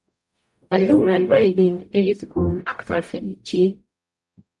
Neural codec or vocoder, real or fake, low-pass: codec, 44.1 kHz, 0.9 kbps, DAC; fake; 10.8 kHz